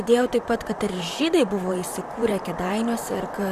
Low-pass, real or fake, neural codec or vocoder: 14.4 kHz; fake; vocoder, 44.1 kHz, 128 mel bands, Pupu-Vocoder